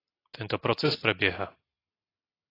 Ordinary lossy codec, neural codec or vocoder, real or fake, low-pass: AAC, 24 kbps; none; real; 5.4 kHz